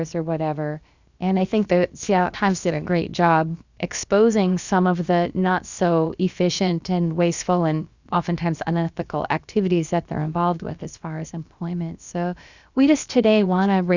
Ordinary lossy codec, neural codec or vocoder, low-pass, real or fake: Opus, 64 kbps; codec, 16 kHz, about 1 kbps, DyCAST, with the encoder's durations; 7.2 kHz; fake